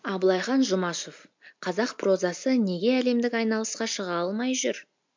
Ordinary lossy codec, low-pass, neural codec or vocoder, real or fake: MP3, 48 kbps; 7.2 kHz; none; real